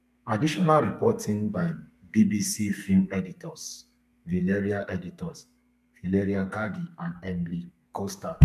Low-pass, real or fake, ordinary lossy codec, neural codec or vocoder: 14.4 kHz; fake; MP3, 96 kbps; codec, 32 kHz, 1.9 kbps, SNAC